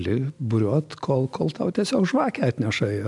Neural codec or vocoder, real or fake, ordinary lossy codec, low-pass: none; real; MP3, 96 kbps; 10.8 kHz